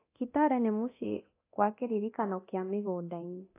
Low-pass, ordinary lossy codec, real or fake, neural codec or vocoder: 3.6 kHz; AAC, 24 kbps; fake; codec, 24 kHz, 0.9 kbps, DualCodec